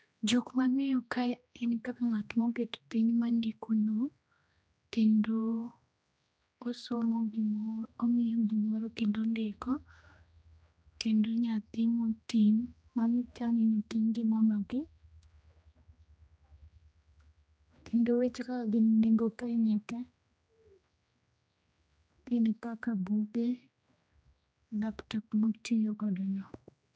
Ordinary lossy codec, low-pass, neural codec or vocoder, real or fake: none; none; codec, 16 kHz, 1 kbps, X-Codec, HuBERT features, trained on general audio; fake